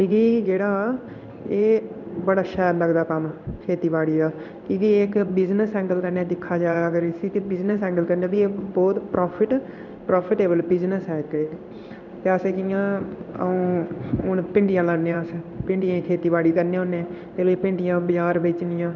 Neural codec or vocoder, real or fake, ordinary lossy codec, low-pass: codec, 16 kHz in and 24 kHz out, 1 kbps, XY-Tokenizer; fake; none; 7.2 kHz